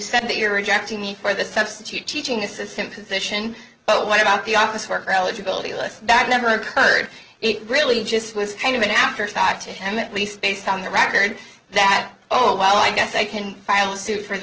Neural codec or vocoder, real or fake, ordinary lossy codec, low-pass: none; real; Opus, 16 kbps; 7.2 kHz